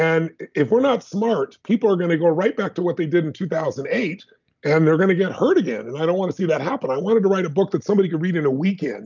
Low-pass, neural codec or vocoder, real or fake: 7.2 kHz; none; real